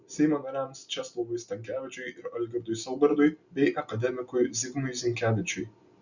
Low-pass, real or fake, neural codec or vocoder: 7.2 kHz; real; none